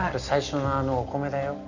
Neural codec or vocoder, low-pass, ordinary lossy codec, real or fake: none; 7.2 kHz; none; real